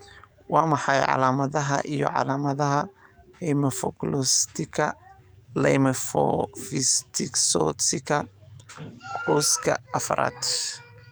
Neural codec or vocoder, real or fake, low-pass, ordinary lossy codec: codec, 44.1 kHz, 7.8 kbps, DAC; fake; none; none